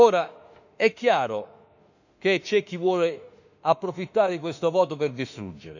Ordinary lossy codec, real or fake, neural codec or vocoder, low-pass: none; fake; autoencoder, 48 kHz, 32 numbers a frame, DAC-VAE, trained on Japanese speech; 7.2 kHz